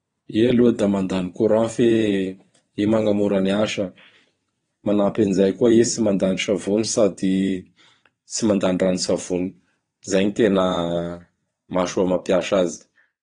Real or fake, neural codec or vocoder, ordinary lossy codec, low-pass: fake; vocoder, 22.05 kHz, 80 mel bands, WaveNeXt; AAC, 32 kbps; 9.9 kHz